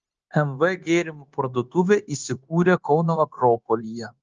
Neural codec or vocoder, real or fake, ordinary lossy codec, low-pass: codec, 16 kHz, 0.9 kbps, LongCat-Audio-Codec; fake; Opus, 24 kbps; 7.2 kHz